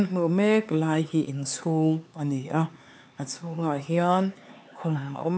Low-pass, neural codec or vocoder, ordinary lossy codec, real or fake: none; codec, 16 kHz, 4 kbps, X-Codec, HuBERT features, trained on LibriSpeech; none; fake